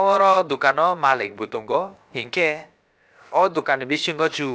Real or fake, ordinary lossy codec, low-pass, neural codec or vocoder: fake; none; none; codec, 16 kHz, about 1 kbps, DyCAST, with the encoder's durations